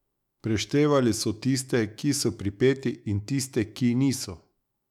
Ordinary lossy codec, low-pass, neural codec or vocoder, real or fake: none; 19.8 kHz; autoencoder, 48 kHz, 128 numbers a frame, DAC-VAE, trained on Japanese speech; fake